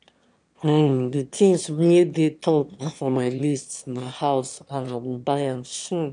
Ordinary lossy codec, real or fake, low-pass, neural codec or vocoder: none; fake; 9.9 kHz; autoencoder, 22.05 kHz, a latent of 192 numbers a frame, VITS, trained on one speaker